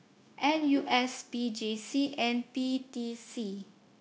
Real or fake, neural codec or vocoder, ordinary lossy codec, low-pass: fake; codec, 16 kHz, 0.9 kbps, LongCat-Audio-Codec; none; none